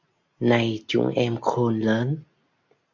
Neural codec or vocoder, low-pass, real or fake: none; 7.2 kHz; real